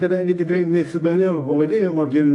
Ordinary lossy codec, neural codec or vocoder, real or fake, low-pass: AAC, 48 kbps; codec, 24 kHz, 0.9 kbps, WavTokenizer, medium music audio release; fake; 10.8 kHz